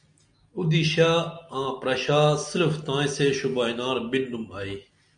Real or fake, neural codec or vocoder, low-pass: real; none; 9.9 kHz